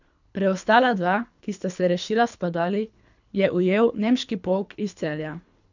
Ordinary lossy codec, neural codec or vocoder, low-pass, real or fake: none; codec, 24 kHz, 3 kbps, HILCodec; 7.2 kHz; fake